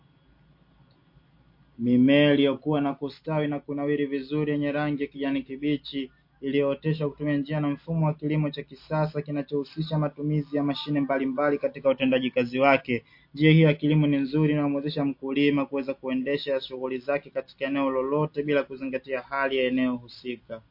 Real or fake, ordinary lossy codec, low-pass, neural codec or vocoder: real; MP3, 32 kbps; 5.4 kHz; none